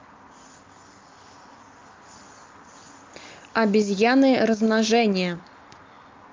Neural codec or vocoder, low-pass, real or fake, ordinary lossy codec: none; 7.2 kHz; real; Opus, 24 kbps